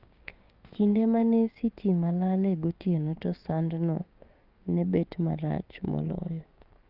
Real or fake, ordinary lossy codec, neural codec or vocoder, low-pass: fake; Opus, 32 kbps; codec, 16 kHz, 6 kbps, DAC; 5.4 kHz